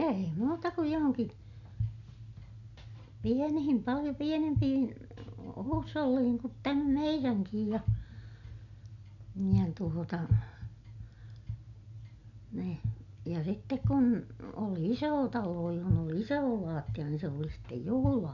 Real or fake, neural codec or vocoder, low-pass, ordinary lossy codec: real; none; 7.2 kHz; none